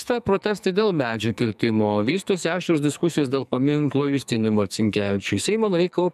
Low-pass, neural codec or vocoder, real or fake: 14.4 kHz; codec, 44.1 kHz, 2.6 kbps, SNAC; fake